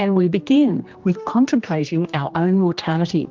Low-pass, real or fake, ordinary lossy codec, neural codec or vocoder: 7.2 kHz; fake; Opus, 24 kbps; codec, 16 kHz, 1 kbps, X-Codec, HuBERT features, trained on general audio